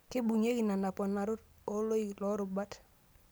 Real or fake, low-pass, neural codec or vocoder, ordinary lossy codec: real; none; none; none